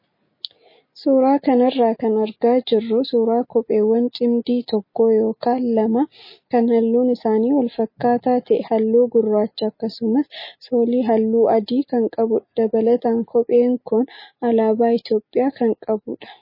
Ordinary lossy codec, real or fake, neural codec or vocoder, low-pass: MP3, 24 kbps; real; none; 5.4 kHz